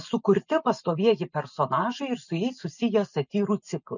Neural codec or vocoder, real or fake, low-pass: none; real; 7.2 kHz